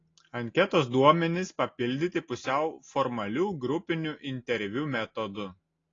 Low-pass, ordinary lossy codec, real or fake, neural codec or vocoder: 7.2 kHz; AAC, 32 kbps; real; none